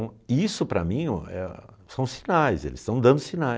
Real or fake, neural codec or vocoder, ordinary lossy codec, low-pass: real; none; none; none